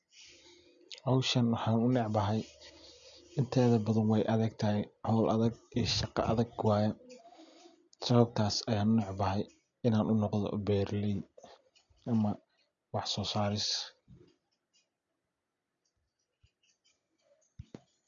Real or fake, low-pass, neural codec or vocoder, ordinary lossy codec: real; 7.2 kHz; none; none